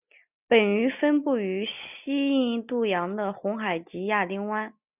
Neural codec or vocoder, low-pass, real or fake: none; 3.6 kHz; real